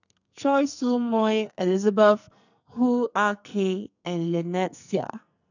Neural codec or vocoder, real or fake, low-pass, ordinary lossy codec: codec, 44.1 kHz, 2.6 kbps, SNAC; fake; 7.2 kHz; none